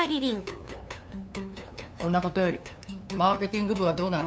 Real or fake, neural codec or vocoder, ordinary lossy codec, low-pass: fake; codec, 16 kHz, 2 kbps, FunCodec, trained on LibriTTS, 25 frames a second; none; none